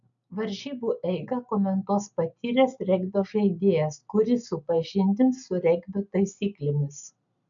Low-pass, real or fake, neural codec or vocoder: 7.2 kHz; real; none